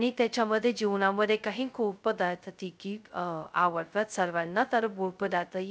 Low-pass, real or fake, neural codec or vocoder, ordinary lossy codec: none; fake; codec, 16 kHz, 0.2 kbps, FocalCodec; none